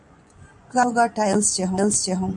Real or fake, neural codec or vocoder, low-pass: fake; vocoder, 24 kHz, 100 mel bands, Vocos; 10.8 kHz